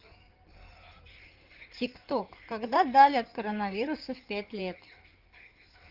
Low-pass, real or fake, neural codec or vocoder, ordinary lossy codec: 5.4 kHz; fake; codec, 16 kHz in and 24 kHz out, 2.2 kbps, FireRedTTS-2 codec; Opus, 24 kbps